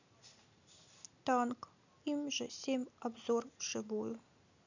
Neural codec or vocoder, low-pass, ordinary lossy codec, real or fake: none; 7.2 kHz; none; real